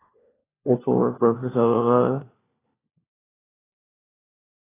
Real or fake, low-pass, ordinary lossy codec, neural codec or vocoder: fake; 3.6 kHz; AAC, 16 kbps; codec, 16 kHz, 1 kbps, FunCodec, trained on LibriTTS, 50 frames a second